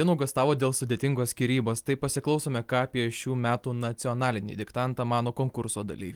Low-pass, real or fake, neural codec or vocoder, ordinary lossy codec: 19.8 kHz; real; none; Opus, 24 kbps